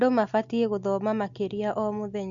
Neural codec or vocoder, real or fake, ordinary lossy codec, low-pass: none; real; Opus, 64 kbps; 7.2 kHz